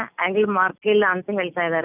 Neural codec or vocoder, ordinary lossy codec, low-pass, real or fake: none; none; 3.6 kHz; real